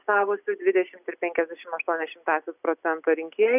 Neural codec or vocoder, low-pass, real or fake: none; 3.6 kHz; real